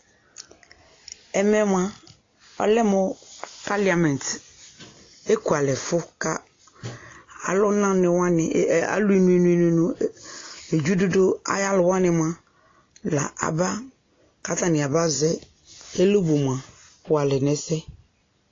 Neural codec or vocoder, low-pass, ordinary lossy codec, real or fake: none; 7.2 kHz; AAC, 32 kbps; real